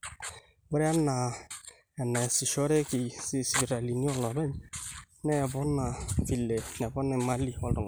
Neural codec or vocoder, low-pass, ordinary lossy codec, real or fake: none; none; none; real